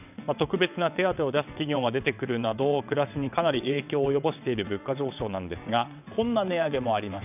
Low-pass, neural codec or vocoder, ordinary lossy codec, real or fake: 3.6 kHz; vocoder, 22.05 kHz, 80 mel bands, WaveNeXt; none; fake